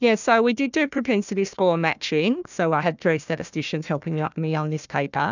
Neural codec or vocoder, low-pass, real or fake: codec, 16 kHz, 1 kbps, FunCodec, trained on Chinese and English, 50 frames a second; 7.2 kHz; fake